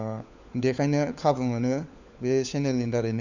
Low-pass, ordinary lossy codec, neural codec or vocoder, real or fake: 7.2 kHz; none; codec, 16 kHz, 4 kbps, FunCodec, trained on LibriTTS, 50 frames a second; fake